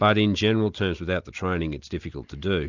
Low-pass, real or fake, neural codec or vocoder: 7.2 kHz; real; none